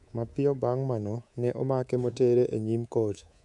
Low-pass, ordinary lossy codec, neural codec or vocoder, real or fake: 10.8 kHz; none; codec, 24 kHz, 3.1 kbps, DualCodec; fake